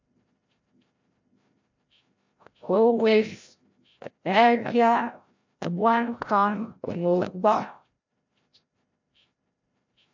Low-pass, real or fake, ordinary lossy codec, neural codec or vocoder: 7.2 kHz; fake; MP3, 48 kbps; codec, 16 kHz, 0.5 kbps, FreqCodec, larger model